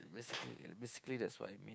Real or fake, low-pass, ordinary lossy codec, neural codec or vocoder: fake; none; none; codec, 16 kHz, 6 kbps, DAC